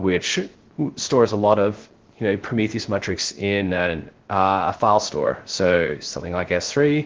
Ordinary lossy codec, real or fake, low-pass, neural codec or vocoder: Opus, 16 kbps; fake; 7.2 kHz; codec, 16 kHz, 0.3 kbps, FocalCodec